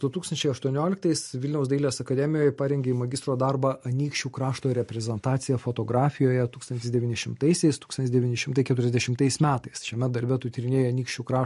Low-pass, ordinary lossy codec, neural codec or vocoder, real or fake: 14.4 kHz; MP3, 48 kbps; none; real